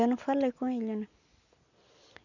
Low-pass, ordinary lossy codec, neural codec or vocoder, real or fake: 7.2 kHz; none; none; real